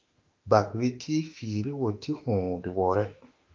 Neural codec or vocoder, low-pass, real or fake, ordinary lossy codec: autoencoder, 48 kHz, 32 numbers a frame, DAC-VAE, trained on Japanese speech; 7.2 kHz; fake; Opus, 24 kbps